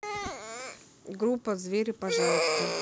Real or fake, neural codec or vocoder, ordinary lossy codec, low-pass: real; none; none; none